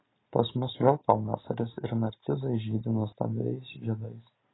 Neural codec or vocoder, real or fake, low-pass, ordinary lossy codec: none; real; 7.2 kHz; AAC, 16 kbps